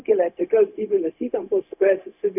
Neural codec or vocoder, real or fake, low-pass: codec, 16 kHz, 0.4 kbps, LongCat-Audio-Codec; fake; 3.6 kHz